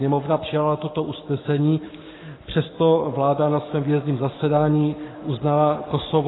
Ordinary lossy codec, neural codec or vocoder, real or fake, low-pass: AAC, 16 kbps; codec, 44.1 kHz, 7.8 kbps, Pupu-Codec; fake; 7.2 kHz